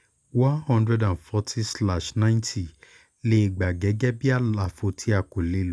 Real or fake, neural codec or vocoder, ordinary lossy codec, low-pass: real; none; none; none